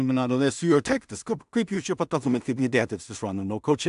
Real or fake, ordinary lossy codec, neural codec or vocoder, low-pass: fake; AAC, 96 kbps; codec, 16 kHz in and 24 kHz out, 0.4 kbps, LongCat-Audio-Codec, two codebook decoder; 10.8 kHz